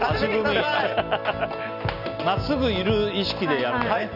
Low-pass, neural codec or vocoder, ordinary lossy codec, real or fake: 5.4 kHz; none; none; real